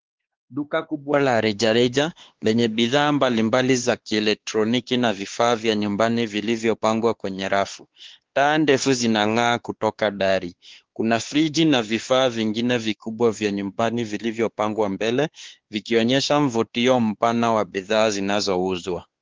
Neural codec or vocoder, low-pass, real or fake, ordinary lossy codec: codec, 16 kHz, 2 kbps, X-Codec, WavLM features, trained on Multilingual LibriSpeech; 7.2 kHz; fake; Opus, 16 kbps